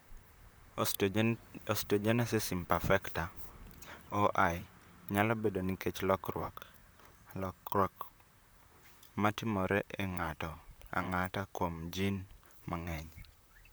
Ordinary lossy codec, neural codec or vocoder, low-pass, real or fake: none; vocoder, 44.1 kHz, 128 mel bands, Pupu-Vocoder; none; fake